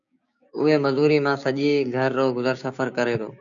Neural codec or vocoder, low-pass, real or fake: codec, 16 kHz, 6 kbps, DAC; 7.2 kHz; fake